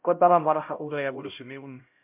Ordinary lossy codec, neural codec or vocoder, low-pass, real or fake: MP3, 32 kbps; codec, 16 kHz, 0.5 kbps, X-Codec, HuBERT features, trained on LibriSpeech; 3.6 kHz; fake